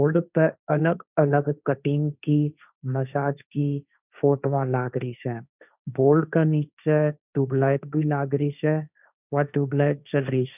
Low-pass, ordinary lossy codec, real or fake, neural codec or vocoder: 3.6 kHz; none; fake; codec, 16 kHz, 1.1 kbps, Voila-Tokenizer